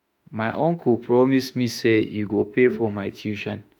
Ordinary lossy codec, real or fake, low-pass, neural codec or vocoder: none; fake; 19.8 kHz; autoencoder, 48 kHz, 32 numbers a frame, DAC-VAE, trained on Japanese speech